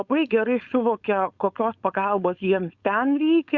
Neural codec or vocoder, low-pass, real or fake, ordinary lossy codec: codec, 16 kHz, 4.8 kbps, FACodec; 7.2 kHz; fake; Opus, 64 kbps